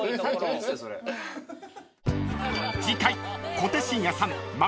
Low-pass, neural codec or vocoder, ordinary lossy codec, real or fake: none; none; none; real